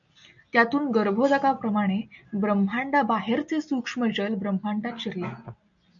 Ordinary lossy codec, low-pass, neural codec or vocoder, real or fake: MP3, 64 kbps; 7.2 kHz; none; real